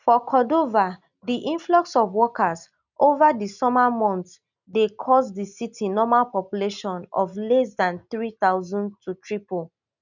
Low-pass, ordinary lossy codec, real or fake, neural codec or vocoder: 7.2 kHz; none; real; none